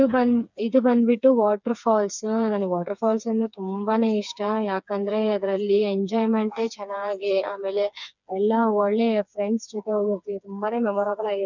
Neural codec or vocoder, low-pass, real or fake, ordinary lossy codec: codec, 16 kHz, 4 kbps, FreqCodec, smaller model; 7.2 kHz; fake; none